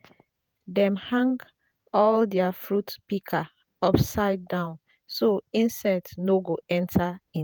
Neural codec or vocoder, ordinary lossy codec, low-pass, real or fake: vocoder, 48 kHz, 128 mel bands, Vocos; none; none; fake